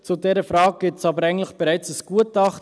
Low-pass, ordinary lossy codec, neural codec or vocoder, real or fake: none; none; none; real